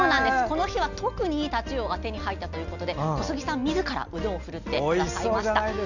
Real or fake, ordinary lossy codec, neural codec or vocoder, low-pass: real; none; none; 7.2 kHz